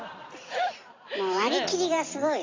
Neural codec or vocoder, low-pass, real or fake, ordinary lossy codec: none; 7.2 kHz; real; AAC, 32 kbps